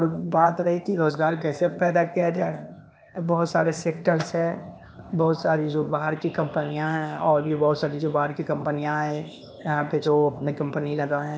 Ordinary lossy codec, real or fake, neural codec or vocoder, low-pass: none; fake; codec, 16 kHz, 0.8 kbps, ZipCodec; none